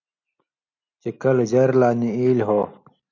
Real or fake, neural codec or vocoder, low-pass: real; none; 7.2 kHz